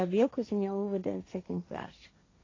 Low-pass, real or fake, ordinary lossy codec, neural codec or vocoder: 7.2 kHz; fake; AAC, 32 kbps; codec, 16 kHz, 1.1 kbps, Voila-Tokenizer